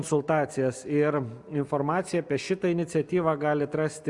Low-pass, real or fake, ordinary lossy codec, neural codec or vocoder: 10.8 kHz; real; Opus, 64 kbps; none